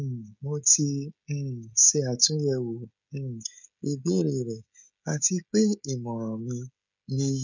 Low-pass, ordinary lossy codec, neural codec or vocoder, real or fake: 7.2 kHz; none; codec, 16 kHz, 16 kbps, FreqCodec, smaller model; fake